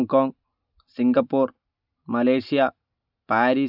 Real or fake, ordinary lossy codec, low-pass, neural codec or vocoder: real; none; 5.4 kHz; none